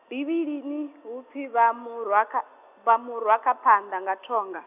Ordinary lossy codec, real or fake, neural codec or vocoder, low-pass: none; real; none; 3.6 kHz